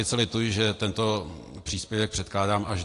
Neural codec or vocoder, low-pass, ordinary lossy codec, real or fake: none; 10.8 kHz; AAC, 48 kbps; real